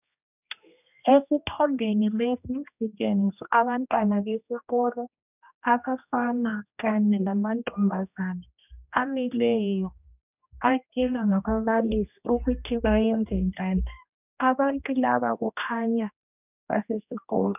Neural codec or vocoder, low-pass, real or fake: codec, 16 kHz, 1 kbps, X-Codec, HuBERT features, trained on general audio; 3.6 kHz; fake